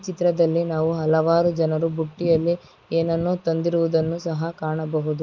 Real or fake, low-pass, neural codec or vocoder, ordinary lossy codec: real; 7.2 kHz; none; Opus, 24 kbps